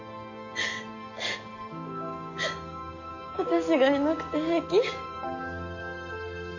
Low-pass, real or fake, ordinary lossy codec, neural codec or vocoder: 7.2 kHz; fake; none; codec, 44.1 kHz, 7.8 kbps, DAC